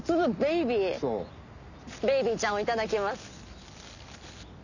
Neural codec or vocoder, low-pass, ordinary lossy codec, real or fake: none; 7.2 kHz; none; real